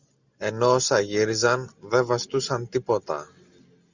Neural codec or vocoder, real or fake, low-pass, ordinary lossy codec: none; real; 7.2 kHz; Opus, 64 kbps